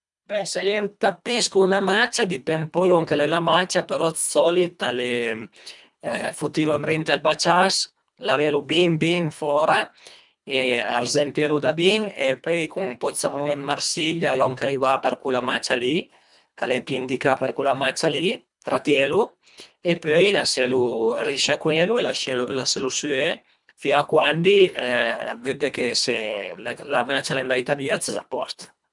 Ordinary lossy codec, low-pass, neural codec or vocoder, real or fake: none; 10.8 kHz; codec, 24 kHz, 1.5 kbps, HILCodec; fake